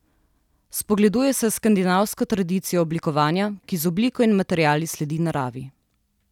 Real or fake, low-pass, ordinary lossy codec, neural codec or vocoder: real; 19.8 kHz; none; none